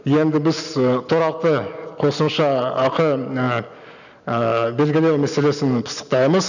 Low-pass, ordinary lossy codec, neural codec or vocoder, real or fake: 7.2 kHz; none; none; real